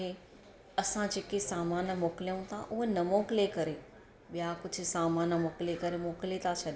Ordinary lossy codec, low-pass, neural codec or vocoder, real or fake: none; none; none; real